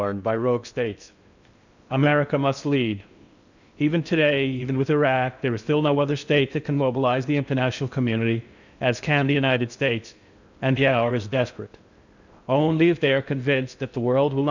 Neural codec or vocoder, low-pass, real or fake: codec, 16 kHz in and 24 kHz out, 0.6 kbps, FocalCodec, streaming, 2048 codes; 7.2 kHz; fake